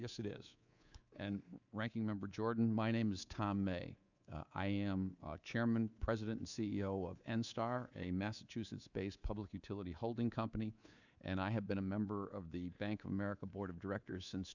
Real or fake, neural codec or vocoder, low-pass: fake; codec, 24 kHz, 3.1 kbps, DualCodec; 7.2 kHz